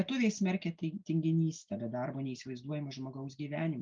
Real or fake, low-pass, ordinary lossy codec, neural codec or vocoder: real; 7.2 kHz; Opus, 24 kbps; none